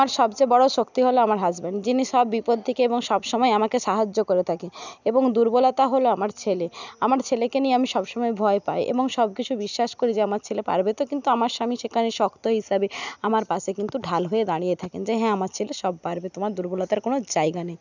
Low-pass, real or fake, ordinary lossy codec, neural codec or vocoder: 7.2 kHz; real; none; none